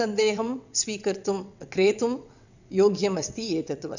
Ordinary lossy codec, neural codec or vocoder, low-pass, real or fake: none; vocoder, 22.05 kHz, 80 mel bands, WaveNeXt; 7.2 kHz; fake